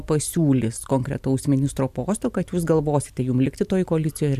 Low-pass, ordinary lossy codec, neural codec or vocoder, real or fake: 14.4 kHz; MP3, 96 kbps; none; real